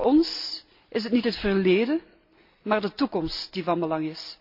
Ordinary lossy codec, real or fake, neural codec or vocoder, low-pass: MP3, 32 kbps; real; none; 5.4 kHz